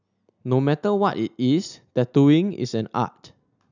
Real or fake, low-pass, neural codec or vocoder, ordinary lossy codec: real; 7.2 kHz; none; none